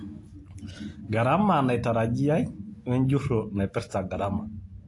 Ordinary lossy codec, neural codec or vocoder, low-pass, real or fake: AAC, 48 kbps; none; 10.8 kHz; real